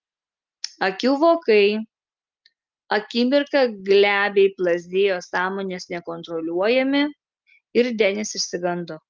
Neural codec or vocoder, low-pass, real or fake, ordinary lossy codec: none; 7.2 kHz; real; Opus, 24 kbps